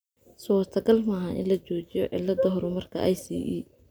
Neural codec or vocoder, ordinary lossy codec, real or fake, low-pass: none; none; real; none